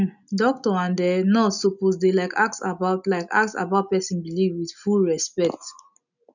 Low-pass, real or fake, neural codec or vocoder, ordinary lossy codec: 7.2 kHz; real; none; none